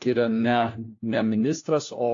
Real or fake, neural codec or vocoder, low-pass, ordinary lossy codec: fake; codec, 16 kHz, 1 kbps, FunCodec, trained on LibriTTS, 50 frames a second; 7.2 kHz; AAC, 48 kbps